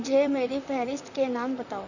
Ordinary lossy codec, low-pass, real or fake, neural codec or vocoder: none; 7.2 kHz; fake; vocoder, 44.1 kHz, 128 mel bands, Pupu-Vocoder